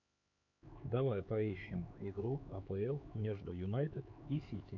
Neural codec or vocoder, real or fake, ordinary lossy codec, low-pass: codec, 16 kHz, 4 kbps, X-Codec, HuBERT features, trained on LibriSpeech; fake; MP3, 48 kbps; 7.2 kHz